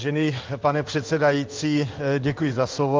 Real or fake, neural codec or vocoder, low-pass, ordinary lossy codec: fake; codec, 16 kHz in and 24 kHz out, 1 kbps, XY-Tokenizer; 7.2 kHz; Opus, 32 kbps